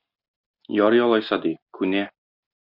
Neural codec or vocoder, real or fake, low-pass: none; real; 5.4 kHz